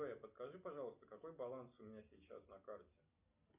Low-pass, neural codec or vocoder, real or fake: 3.6 kHz; none; real